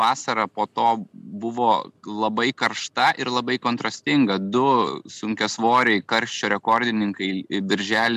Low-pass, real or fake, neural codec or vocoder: 14.4 kHz; real; none